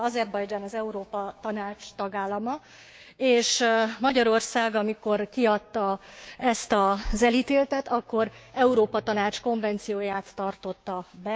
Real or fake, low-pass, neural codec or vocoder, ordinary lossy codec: fake; none; codec, 16 kHz, 6 kbps, DAC; none